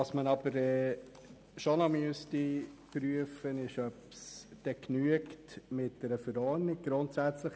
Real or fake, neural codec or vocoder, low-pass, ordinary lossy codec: real; none; none; none